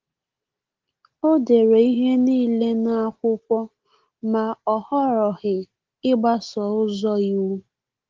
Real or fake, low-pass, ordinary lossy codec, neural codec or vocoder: real; 7.2 kHz; Opus, 16 kbps; none